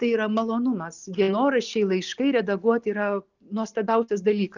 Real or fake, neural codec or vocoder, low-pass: real; none; 7.2 kHz